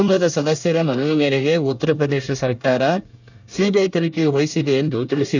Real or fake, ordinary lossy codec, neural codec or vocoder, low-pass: fake; none; codec, 24 kHz, 1 kbps, SNAC; 7.2 kHz